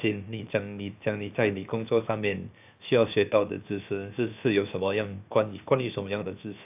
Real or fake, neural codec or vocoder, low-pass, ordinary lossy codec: fake; codec, 16 kHz, 0.7 kbps, FocalCodec; 3.6 kHz; AAC, 32 kbps